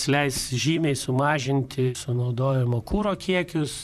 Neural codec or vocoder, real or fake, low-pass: vocoder, 44.1 kHz, 128 mel bands, Pupu-Vocoder; fake; 14.4 kHz